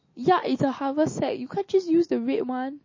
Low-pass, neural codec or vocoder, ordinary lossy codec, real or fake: 7.2 kHz; none; MP3, 32 kbps; real